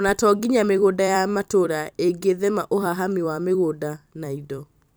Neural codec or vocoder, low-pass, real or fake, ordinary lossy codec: vocoder, 44.1 kHz, 128 mel bands every 256 samples, BigVGAN v2; none; fake; none